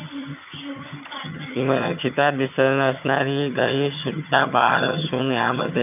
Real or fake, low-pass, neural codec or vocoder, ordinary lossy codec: fake; 3.6 kHz; vocoder, 22.05 kHz, 80 mel bands, HiFi-GAN; none